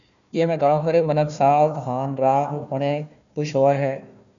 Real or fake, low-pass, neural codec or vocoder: fake; 7.2 kHz; codec, 16 kHz, 1 kbps, FunCodec, trained on Chinese and English, 50 frames a second